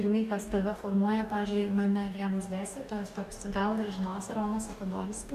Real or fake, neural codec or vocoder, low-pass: fake; codec, 44.1 kHz, 2.6 kbps, DAC; 14.4 kHz